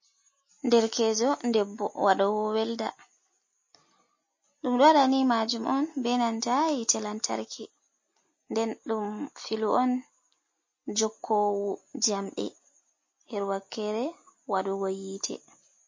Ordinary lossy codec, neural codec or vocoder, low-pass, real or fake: MP3, 32 kbps; none; 7.2 kHz; real